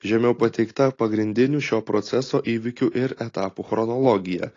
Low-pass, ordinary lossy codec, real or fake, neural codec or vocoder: 7.2 kHz; AAC, 32 kbps; real; none